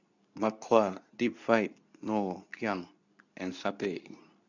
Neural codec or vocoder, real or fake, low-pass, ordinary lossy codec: codec, 24 kHz, 0.9 kbps, WavTokenizer, medium speech release version 2; fake; 7.2 kHz; none